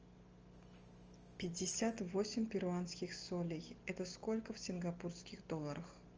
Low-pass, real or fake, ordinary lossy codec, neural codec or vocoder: 7.2 kHz; real; Opus, 24 kbps; none